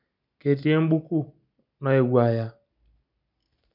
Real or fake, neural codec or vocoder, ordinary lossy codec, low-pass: real; none; none; 5.4 kHz